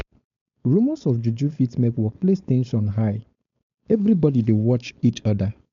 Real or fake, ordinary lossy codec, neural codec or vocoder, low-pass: fake; MP3, 64 kbps; codec, 16 kHz, 4.8 kbps, FACodec; 7.2 kHz